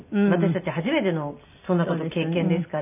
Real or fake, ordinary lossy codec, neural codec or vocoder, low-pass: real; none; none; 3.6 kHz